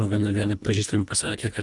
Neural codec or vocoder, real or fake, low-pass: codec, 24 kHz, 1.5 kbps, HILCodec; fake; 10.8 kHz